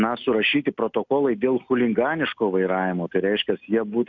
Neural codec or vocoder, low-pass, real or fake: none; 7.2 kHz; real